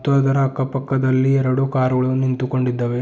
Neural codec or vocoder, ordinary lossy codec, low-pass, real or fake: none; none; none; real